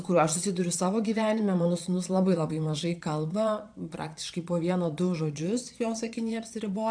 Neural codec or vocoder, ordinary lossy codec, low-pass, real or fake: vocoder, 22.05 kHz, 80 mel bands, Vocos; AAC, 64 kbps; 9.9 kHz; fake